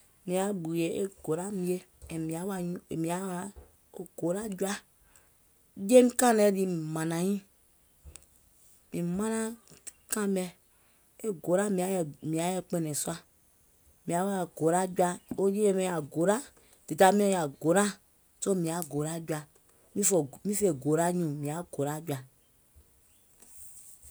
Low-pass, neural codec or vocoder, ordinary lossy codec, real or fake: none; none; none; real